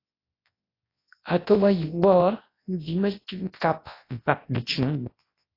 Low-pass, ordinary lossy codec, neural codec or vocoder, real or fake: 5.4 kHz; AAC, 24 kbps; codec, 24 kHz, 0.9 kbps, WavTokenizer, large speech release; fake